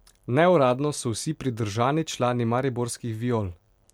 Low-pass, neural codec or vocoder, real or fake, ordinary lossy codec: 19.8 kHz; none; real; MP3, 96 kbps